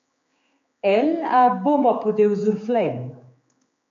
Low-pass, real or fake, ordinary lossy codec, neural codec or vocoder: 7.2 kHz; fake; MP3, 48 kbps; codec, 16 kHz, 4 kbps, X-Codec, HuBERT features, trained on general audio